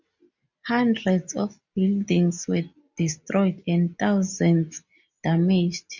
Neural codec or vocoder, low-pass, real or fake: none; 7.2 kHz; real